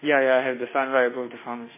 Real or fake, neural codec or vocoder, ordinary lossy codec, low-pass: fake; autoencoder, 48 kHz, 32 numbers a frame, DAC-VAE, trained on Japanese speech; MP3, 16 kbps; 3.6 kHz